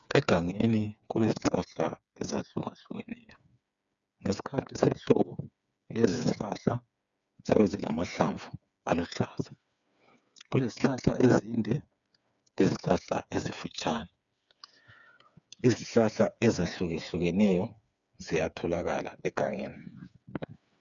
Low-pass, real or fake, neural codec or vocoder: 7.2 kHz; fake; codec, 16 kHz, 4 kbps, FreqCodec, smaller model